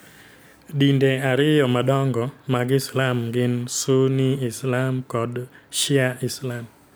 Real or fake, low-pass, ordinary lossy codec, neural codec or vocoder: real; none; none; none